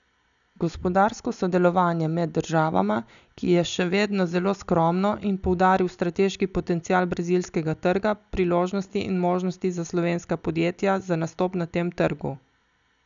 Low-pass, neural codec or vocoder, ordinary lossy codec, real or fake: 7.2 kHz; none; none; real